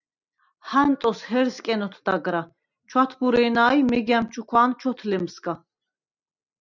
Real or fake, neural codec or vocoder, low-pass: real; none; 7.2 kHz